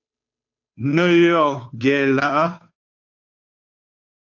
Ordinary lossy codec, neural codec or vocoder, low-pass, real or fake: AAC, 48 kbps; codec, 16 kHz, 2 kbps, FunCodec, trained on Chinese and English, 25 frames a second; 7.2 kHz; fake